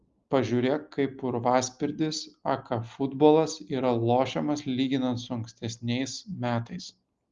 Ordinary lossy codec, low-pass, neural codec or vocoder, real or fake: Opus, 32 kbps; 7.2 kHz; none; real